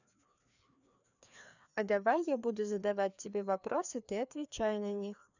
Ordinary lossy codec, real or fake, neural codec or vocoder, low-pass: none; fake; codec, 16 kHz, 2 kbps, FreqCodec, larger model; 7.2 kHz